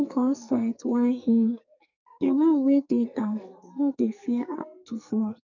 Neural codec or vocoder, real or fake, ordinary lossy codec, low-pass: codec, 44.1 kHz, 2.6 kbps, SNAC; fake; none; 7.2 kHz